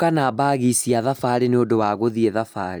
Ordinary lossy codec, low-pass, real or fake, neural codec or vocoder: none; none; real; none